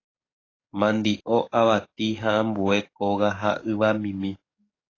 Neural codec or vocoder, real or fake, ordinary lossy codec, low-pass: codec, 44.1 kHz, 7.8 kbps, DAC; fake; AAC, 32 kbps; 7.2 kHz